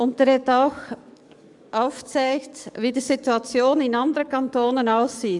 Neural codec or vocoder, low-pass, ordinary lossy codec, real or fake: codec, 44.1 kHz, 7.8 kbps, DAC; 10.8 kHz; MP3, 96 kbps; fake